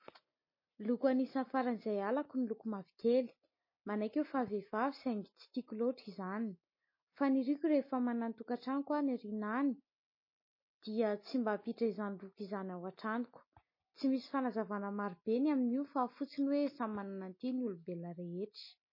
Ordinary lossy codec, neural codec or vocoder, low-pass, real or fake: MP3, 24 kbps; none; 5.4 kHz; real